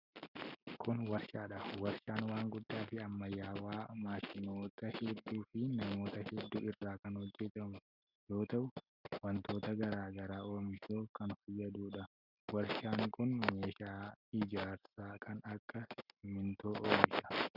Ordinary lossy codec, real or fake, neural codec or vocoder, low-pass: Opus, 64 kbps; real; none; 5.4 kHz